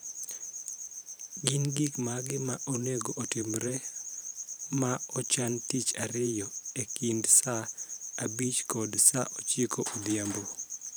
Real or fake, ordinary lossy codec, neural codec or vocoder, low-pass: fake; none; vocoder, 44.1 kHz, 128 mel bands every 512 samples, BigVGAN v2; none